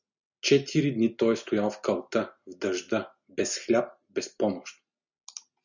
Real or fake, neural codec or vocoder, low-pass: real; none; 7.2 kHz